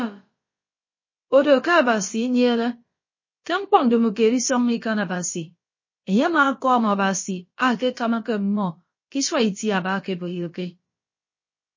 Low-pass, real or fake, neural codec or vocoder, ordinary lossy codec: 7.2 kHz; fake; codec, 16 kHz, about 1 kbps, DyCAST, with the encoder's durations; MP3, 32 kbps